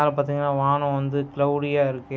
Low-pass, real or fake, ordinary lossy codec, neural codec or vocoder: none; real; none; none